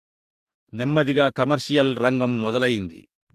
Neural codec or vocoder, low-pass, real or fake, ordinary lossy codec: codec, 44.1 kHz, 2.6 kbps, DAC; 14.4 kHz; fake; AAC, 96 kbps